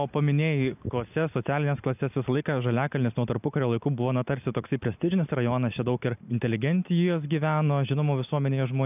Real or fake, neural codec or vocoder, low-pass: real; none; 3.6 kHz